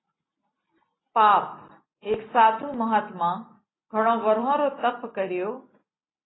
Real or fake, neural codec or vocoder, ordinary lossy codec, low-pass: real; none; AAC, 16 kbps; 7.2 kHz